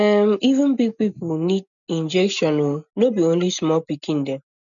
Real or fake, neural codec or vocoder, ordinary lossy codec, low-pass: real; none; none; 7.2 kHz